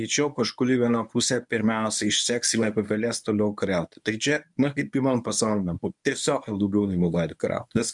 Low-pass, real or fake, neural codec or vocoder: 10.8 kHz; fake; codec, 24 kHz, 0.9 kbps, WavTokenizer, medium speech release version 1